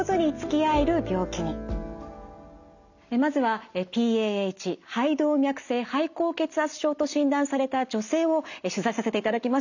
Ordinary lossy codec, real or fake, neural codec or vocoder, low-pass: none; real; none; 7.2 kHz